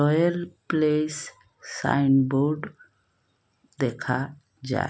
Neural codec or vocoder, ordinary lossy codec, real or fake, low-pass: none; none; real; none